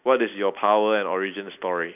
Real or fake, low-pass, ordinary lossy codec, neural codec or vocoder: real; 3.6 kHz; none; none